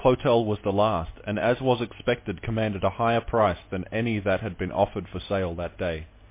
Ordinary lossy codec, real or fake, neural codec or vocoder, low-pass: MP3, 24 kbps; real; none; 3.6 kHz